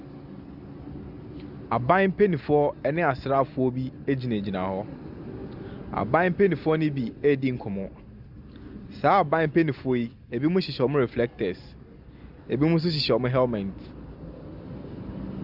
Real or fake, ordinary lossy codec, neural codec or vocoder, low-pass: real; Opus, 64 kbps; none; 5.4 kHz